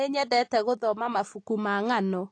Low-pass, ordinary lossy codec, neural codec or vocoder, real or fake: 9.9 kHz; AAC, 48 kbps; none; real